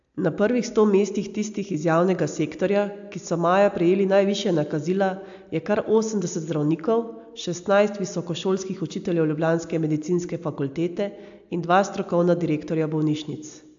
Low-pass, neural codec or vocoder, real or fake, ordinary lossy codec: 7.2 kHz; none; real; AAC, 64 kbps